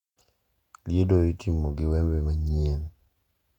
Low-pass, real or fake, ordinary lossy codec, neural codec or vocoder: 19.8 kHz; fake; none; vocoder, 48 kHz, 128 mel bands, Vocos